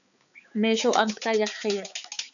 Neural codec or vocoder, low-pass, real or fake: codec, 16 kHz, 4 kbps, X-Codec, HuBERT features, trained on balanced general audio; 7.2 kHz; fake